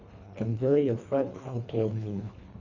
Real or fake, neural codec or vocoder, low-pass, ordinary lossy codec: fake; codec, 24 kHz, 1.5 kbps, HILCodec; 7.2 kHz; none